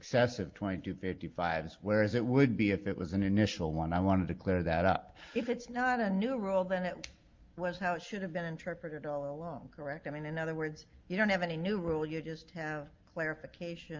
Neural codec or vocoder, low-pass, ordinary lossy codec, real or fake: none; 7.2 kHz; Opus, 32 kbps; real